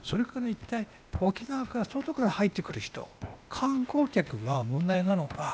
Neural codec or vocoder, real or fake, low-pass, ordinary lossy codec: codec, 16 kHz, 0.8 kbps, ZipCodec; fake; none; none